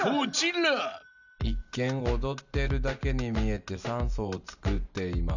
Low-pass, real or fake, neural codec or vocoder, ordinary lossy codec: 7.2 kHz; real; none; none